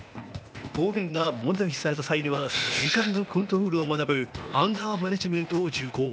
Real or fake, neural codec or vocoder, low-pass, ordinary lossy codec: fake; codec, 16 kHz, 0.8 kbps, ZipCodec; none; none